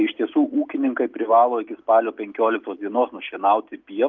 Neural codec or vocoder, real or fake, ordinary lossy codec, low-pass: none; real; Opus, 24 kbps; 7.2 kHz